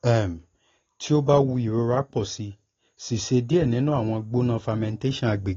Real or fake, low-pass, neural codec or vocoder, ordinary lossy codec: real; 7.2 kHz; none; AAC, 32 kbps